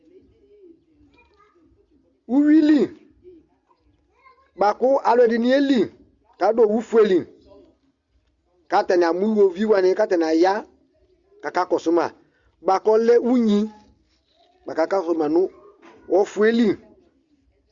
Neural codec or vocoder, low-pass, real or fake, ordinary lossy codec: none; 7.2 kHz; real; Opus, 64 kbps